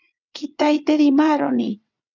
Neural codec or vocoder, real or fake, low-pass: vocoder, 22.05 kHz, 80 mel bands, WaveNeXt; fake; 7.2 kHz